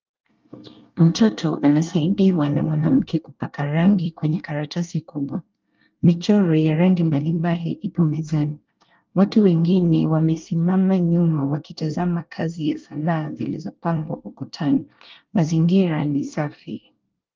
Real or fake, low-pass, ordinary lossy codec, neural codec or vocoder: fake; 7.2 kHz; Opus, 24 kbps; codec, 24 kHz, 1 kbps, SNAC